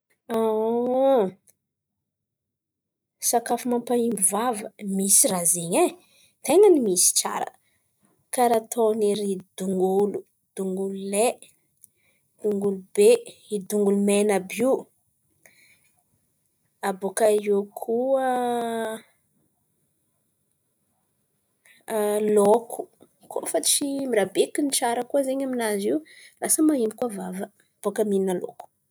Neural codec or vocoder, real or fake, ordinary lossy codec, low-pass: none; real; none; none